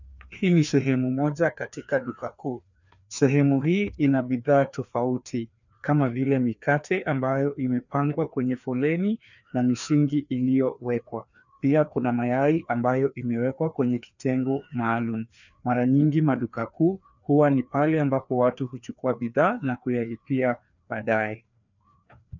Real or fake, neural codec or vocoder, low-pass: fake; codec, 16 kHz, 2 kbps, FreqCodec, larger model; 7.2 kHz